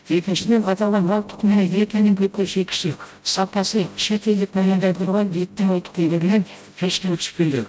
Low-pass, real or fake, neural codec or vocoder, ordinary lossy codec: none; fake; codec, 16 kHz, 0.5 kbps, FreqCodec, smaller model; none